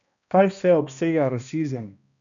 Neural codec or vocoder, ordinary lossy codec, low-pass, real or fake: codec, 16 kHz, 1 kbps, X-Codec, HuBERT features, trained on balanced general audio; none; 7.2 kHz; fake